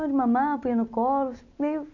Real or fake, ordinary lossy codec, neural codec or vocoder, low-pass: real; none; none; 7.2 kHz